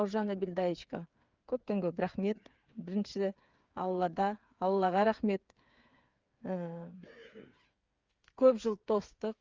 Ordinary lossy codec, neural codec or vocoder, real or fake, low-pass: Opus, 32 kbps; codec, 16 kHz, 8 kbps, FreqCodec, smaller model; fake; 7.2 kHz